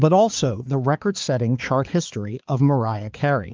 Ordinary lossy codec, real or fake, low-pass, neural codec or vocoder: Opus, 24 kbps; fake; 7.2 kHz; codec, 16 kHz, 4 kbps, X-Codec, HuBERT features, trained on balanced general audio